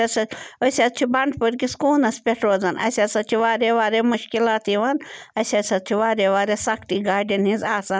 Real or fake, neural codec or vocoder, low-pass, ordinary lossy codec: real; none; none; none